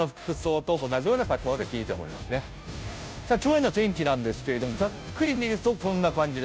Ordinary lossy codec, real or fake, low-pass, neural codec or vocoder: none; fake; none; codec, 16 kHz, 0.5 kbps, FunCodec, trained on Chinese and English, 25 frames a second